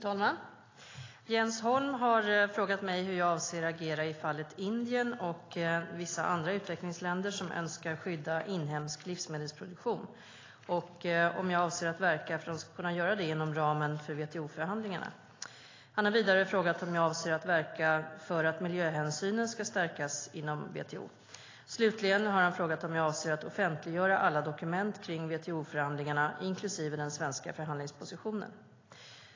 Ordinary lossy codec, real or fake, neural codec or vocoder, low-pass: AAC, 32 kbps; real; none; 7.2 kHz